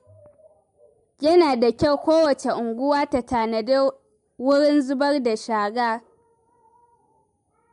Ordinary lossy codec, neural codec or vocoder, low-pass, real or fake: MP3, 64 kbps; none; 10.8 kHz; real